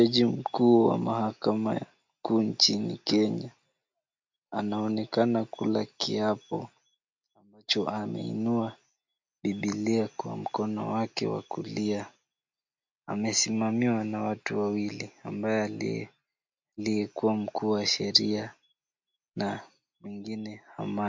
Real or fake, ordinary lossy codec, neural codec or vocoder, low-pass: real; MP3, 48 kbps; none; 7.2 kHz